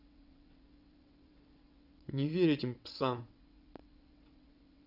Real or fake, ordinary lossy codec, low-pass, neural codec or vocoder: fake; none; 5.4 kHz; vocoder, 22.05 kHz, 80 mel bands, WaveNeXt